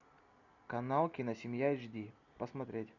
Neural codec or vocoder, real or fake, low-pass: none; real; 7.2 kHz